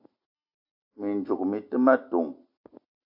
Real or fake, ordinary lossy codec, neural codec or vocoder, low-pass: real; AAC, 48 kbps; none; 5.4 kHz